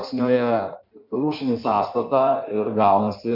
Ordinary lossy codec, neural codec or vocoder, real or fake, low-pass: MP3, 48 kbps; codec, 16 kHz in and 24 kHz out, 1.1 kbps, FireRedTTS-2 codec; fake; 5.4 kHz